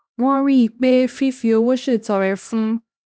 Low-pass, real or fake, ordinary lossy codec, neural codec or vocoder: none; fake; none; codec, 16 kHz, 1 kbps, X-Codec, HuBERT features, trained on LibriSpeech